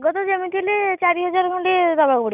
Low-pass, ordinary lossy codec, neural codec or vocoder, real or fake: 3.6 kHz; none; none; real